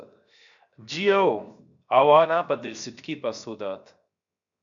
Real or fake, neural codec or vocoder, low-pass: fake; codec, 16 kHz, 0.7 kbps, FocalCodec; 7.2 kHz